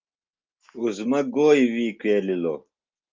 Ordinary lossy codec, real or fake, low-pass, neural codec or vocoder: Opus, 24 kbps; real; 7.2 kHz; none